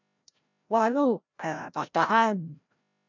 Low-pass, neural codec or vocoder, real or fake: 7.2 kHz; codec, 16 kHz, 0.5 kbps, FreqCodec, larger model; fake